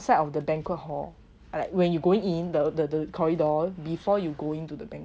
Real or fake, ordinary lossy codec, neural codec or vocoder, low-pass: real; none; none; none